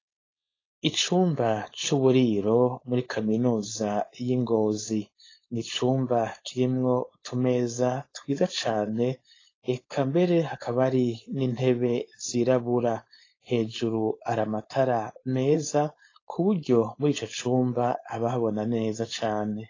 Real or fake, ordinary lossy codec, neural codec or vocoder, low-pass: fake; AAC, 32 kbps; codec, 16 kHz, 4.8 kbps, FACodec; 7.2 kHz